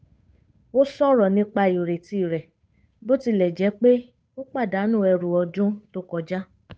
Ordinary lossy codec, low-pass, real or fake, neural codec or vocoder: none; none; fake; codec, 16 kHz, 8 kbps, FunCodec, trained on Chinese and English, 25 frames a second